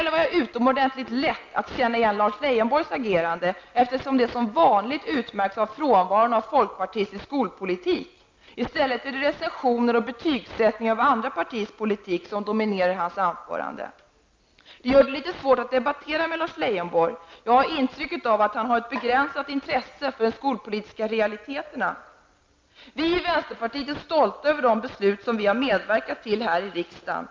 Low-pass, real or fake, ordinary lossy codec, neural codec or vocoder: 7.2 kHz; real; Opus, 24 kbps; none